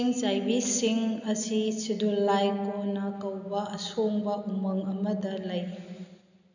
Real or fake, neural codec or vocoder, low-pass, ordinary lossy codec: real; none; 7.2 kHz; none